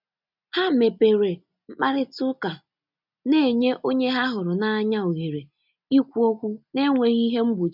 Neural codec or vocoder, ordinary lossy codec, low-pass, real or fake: none; none; 5.4 kHz; real